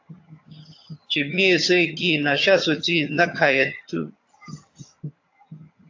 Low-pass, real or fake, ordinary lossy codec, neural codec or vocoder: 7.2 kHz; fake; AAC, 48 kbps; vocoder, 22.05 kHz, 80 mel bands, HiFi-GAN